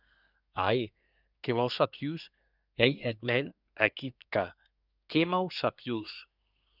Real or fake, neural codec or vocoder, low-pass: fake; codec, 24 kHz, 1 kbps, SNAC; 5.4 kHz